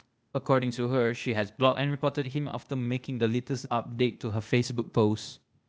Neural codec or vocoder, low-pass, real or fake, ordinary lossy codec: codec, 16 kHz, 0.8 kbps, ZipCodec; none; fake; none